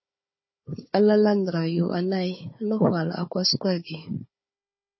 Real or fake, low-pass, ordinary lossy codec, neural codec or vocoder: fake; 7.2 kHz; MP3, 24 kbps; codec, 16 kHz, 4 kbps, FunCodec, trained on Chinese and English, 50 frames a second